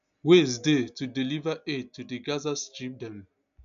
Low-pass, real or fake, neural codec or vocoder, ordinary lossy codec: 7.2 kHz; real; none; AAC, 96 kbps